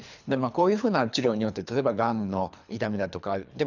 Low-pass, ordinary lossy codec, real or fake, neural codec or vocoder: 7.2 kHz; none; fake; codec, 24 kHz, 3 kbps, HILCodec